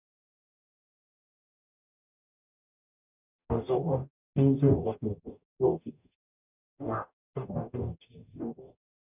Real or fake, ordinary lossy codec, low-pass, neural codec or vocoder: fake; none; 3.6 kHz; codec, 44.1 kHz, 0.9 kbps, DAC